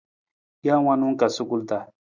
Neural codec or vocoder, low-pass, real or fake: none; 7.2 kHz; real